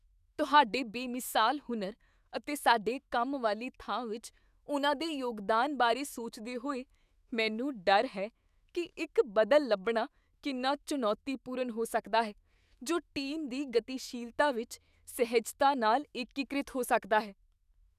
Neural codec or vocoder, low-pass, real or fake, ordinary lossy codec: autoencoder, 48 kHz, 128 numbers a frame, DAC-VAE, trained on Japanese speech; 14.4 kHz; fake; none